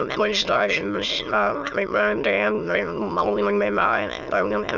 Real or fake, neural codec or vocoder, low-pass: fake; autoencoder, 22.05 kHz, a latent of 192 numbers a frame, VITS, trained on many speakers; 7.2 kHz